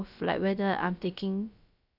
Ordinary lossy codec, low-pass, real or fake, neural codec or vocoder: none; 5.4 kHz; fake; codec, 16 kHz, about 1 kbps, DyCAST, with the encoder's durations